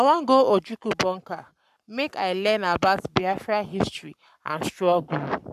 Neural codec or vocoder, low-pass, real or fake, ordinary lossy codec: codec, 44.1 kHz, 7.8 kbps, Pupu-Codec; 14.4 kHz; fake; AAC, 96 kbps